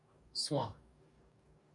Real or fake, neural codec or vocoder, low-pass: fake; codec, 44.1 kHz, 2.6 kbps, DAC; 10.8 kHz